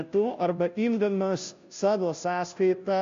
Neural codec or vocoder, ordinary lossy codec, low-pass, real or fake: codec, 16 kHz, 0.5 kbps, FunCodec, trained on Chinese and English, 25 frames a second; MP3, 48 kbps; 7.2 kHz; fake